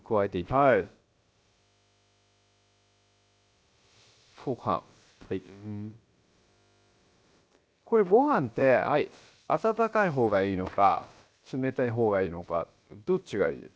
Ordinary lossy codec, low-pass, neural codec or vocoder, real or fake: none; none; codec, 16 kHz, about 1 kbps, DyCAST, with the encoder's durations; fake